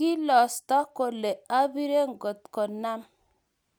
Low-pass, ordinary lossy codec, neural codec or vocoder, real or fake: none; none; none; real